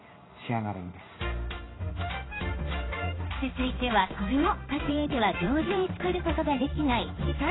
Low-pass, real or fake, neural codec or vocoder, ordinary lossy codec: 7.2 kHz; fake; codec, 16 kHz in and 24 kHz out, 1 kbps, XY-Tokenizer; AAC, 16 kbps